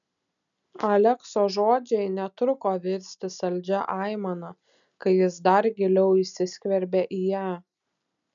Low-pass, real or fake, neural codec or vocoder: 7.2 kHz; real; none